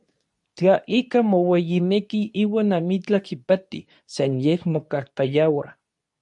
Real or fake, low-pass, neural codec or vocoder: fake; 10.8 kHz; codec, 24 kHz, 0.9 kbps, WavTokenizer, medium speech release version 2